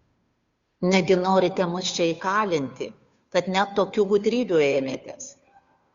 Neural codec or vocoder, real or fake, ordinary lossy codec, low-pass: codec, 16 kHz, 2 kbps, FunCodec, trained on Chinese and English, 25 frames a second; fake; Opus, 64 kbps; 7.2 kHz